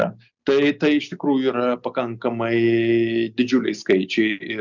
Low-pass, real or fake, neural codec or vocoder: 7.2 kHz; real; none